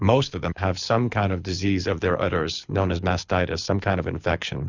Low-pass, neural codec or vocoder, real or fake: 7.2 kHz; codec, 24 kHz, 6 kbps, HILCodec; fake